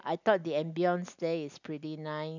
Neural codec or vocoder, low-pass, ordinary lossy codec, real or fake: none; 7.2 kHz; none; real